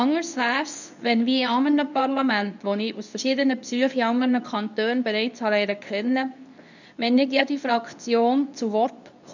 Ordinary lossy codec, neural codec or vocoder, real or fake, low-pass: none; codec, 24 kHz, 0.9 kbps, WavTokenizer, medium speech release version 2; fake; 7.2 kHz